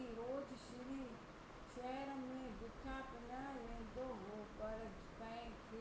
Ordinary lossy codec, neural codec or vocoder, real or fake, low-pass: none; none; real; none